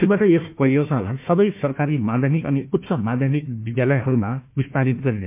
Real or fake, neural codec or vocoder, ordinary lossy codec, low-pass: fake; codec, 16 kHz, 1 kbps, FunCodec, trained on Chinese and English, 50 frames a second; MP3, 32 kbps; 3.6 kHz